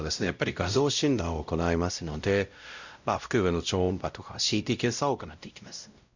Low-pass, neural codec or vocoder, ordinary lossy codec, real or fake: 7.2 kHz; codec, 16 kHz, 0.5 kbps, X-Codec, WavLM features, trained on Multilingual LibriSpeech; none; fake